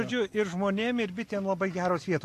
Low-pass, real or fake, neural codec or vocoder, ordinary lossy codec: 14.4 kHz; real; none; AAC, 64 kbps